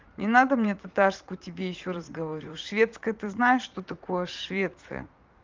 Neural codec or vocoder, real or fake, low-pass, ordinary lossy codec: vocoder, 44.1 kHz, 80 mel bands, Vocos; fake; 7.2 kHz; Opus, 32 kbps